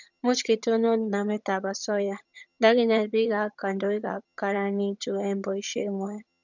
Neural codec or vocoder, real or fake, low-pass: vocoder, 22.05 kHz, 80 mel bands, HiFi-GAN; fake; 7.2 kHz